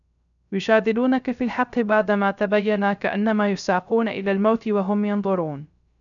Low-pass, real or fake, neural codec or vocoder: 7.2 kHz; fake; codec, 16 kHz, 0.3 kbps, FocalCodec